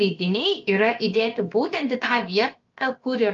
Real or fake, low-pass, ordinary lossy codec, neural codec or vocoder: fake; 7.2 kHz; Opus, 24 kbps; codec, 16 kHz, about 1 kbps, DyCAST, with the encoder's durations